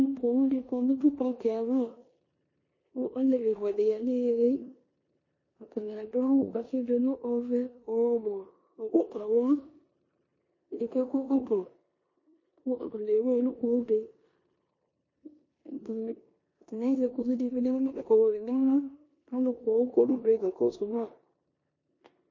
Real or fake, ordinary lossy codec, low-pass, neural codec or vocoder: fake; MP3, 32 kbps; 7.2 kHz; codec, 16 kHz in and 24 kHz out, 0.9 kbps, LongCat-Audio-Codec, four codebook decoder